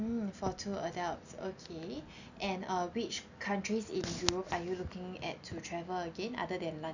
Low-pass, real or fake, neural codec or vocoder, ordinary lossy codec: 7.2 kHz; real; none; none